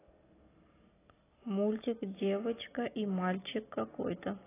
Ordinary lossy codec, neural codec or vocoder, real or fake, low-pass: AAC, 16 kbps; none; real; 3.6 kHz